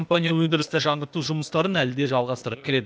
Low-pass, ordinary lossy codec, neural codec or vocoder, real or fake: none; none; codec, 16 kHz, 0.8 kbps, ZipCodec; fake